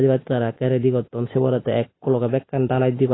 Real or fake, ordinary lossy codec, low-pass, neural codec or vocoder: real; AAC, 16 kbps; 7.2 kHz; none